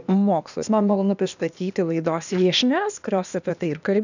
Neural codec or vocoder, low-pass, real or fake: codec, 16 kHz, 0.8 kbps, ZipCodec; 7.2 kHz; fake